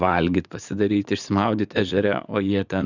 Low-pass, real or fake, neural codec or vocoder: 7.2 kHz; fake; vocoder, 44.1 kHz, 80 mel bands, Vocos